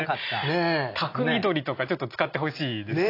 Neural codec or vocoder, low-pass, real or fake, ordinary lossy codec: none; 5.4 kHz; real; AAC, 32 kbps